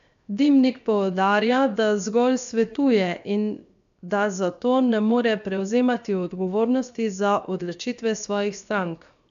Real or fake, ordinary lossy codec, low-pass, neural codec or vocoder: fake; none; 7.2 kHz; codec, 16 kHz, 0.7 kbps, FocalCodec